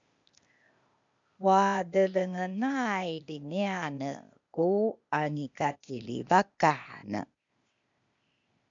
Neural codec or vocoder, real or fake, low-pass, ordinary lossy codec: codec, 16 kHz, 0.8 kbps, ZipCodec; fake; 7.2 kHz; MP3, 64 kbps